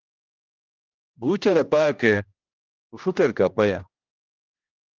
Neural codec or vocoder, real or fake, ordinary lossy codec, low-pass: codec, 16 kHz, 1 kbps, X-Codec, HuBERT features, trained on general audio; fake; Opus, 32 kbps; 7.2 kHz